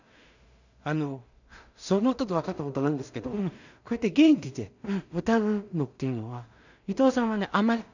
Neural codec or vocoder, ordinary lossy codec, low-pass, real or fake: codec, 16 kHz in and 24 kHz out, 0.4 kbps, LongCat-Audio-Codec, two codebook decoder; none; 7.2 kHz; fake